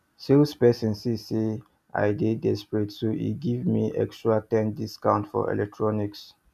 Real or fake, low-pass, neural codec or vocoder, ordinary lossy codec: real; 14.4 kHz; none; none